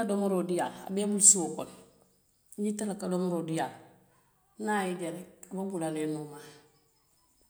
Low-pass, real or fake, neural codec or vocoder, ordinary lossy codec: none; real; none; none